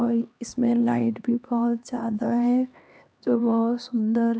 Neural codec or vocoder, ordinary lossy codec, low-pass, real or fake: codec, 16 kHz, 2 kbps, X-Codec, HuBERT features, trained on LibriSpeech; none; none; fake